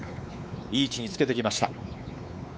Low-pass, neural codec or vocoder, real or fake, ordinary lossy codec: none; codec, 16 kHz, 4 kbps, X-Codec, WavLM features, trained on Multilingual LibriSpeech; fake; none